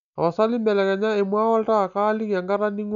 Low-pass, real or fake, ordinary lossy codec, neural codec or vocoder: 7.2 kHz; real; none; none